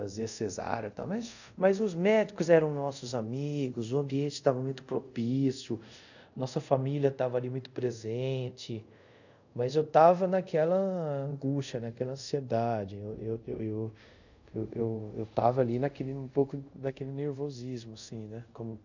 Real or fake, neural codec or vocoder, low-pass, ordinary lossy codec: fake; codec, 24 kHz, 0.5 kbps, DualCodec; 7.2 kHz; none